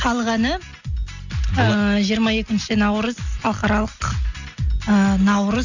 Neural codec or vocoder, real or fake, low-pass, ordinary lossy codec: none; real; 7.2 kHz; none